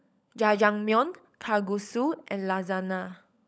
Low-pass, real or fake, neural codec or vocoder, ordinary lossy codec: none; fake; codec, 16 kHz, 16 kbps, FunCodec, trained on LibriTTS, 50 frames a second; none